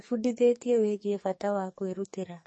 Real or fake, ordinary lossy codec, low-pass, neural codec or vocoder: fake; MP3, 32 kbps; 10.8 kHz; codec, 44.1 kHz, 2.6 kbps, SNAC